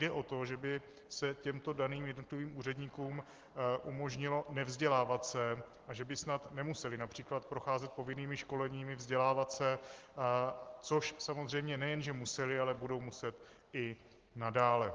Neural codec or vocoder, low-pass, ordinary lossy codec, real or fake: none; 7.2 kHz; Opus, 16 kbps; real